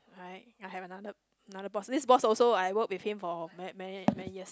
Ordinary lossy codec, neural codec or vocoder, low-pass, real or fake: none; none; none; real